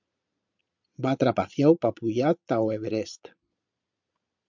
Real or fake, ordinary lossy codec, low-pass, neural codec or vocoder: fake; MP3, 64 kbps; 7.2 kHz; vocoder, 22.05 kHz, 80 mel bands, Vocos